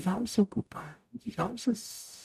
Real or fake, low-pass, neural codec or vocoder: fake; 14.4 kHz; codec, 44.1 kHz, 0.9 kbps, DAC